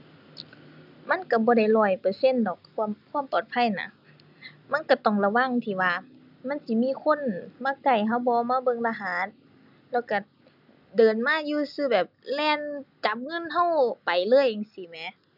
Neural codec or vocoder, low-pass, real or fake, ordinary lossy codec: none; 5.4 kHz; real; none